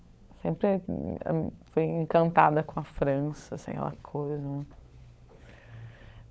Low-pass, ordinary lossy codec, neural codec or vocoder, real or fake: none; none; codec, 16 kHz, 16 kbps, FunCodec, trained on LibriTTS, 50 frames a second; fake